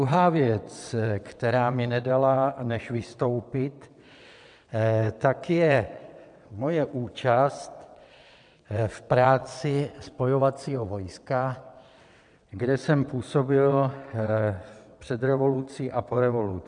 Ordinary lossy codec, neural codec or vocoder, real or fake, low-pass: MP3, 96 kbps; vocoder, 22.05 kHz, 80 mel bands, WaveNeXt; fake; 9.9 kHz